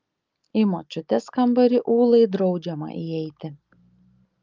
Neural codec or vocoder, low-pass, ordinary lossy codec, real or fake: none; 7.2 kHz; Opus, 24 kbps; real